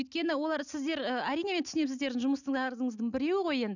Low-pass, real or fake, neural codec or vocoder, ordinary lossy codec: 7.2 kHz; real; none; none